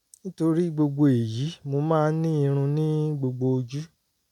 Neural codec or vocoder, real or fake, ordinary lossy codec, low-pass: none; real; none; 19.8 kHz